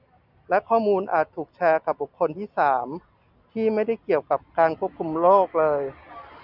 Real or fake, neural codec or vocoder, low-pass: real; none; 5.4 kHz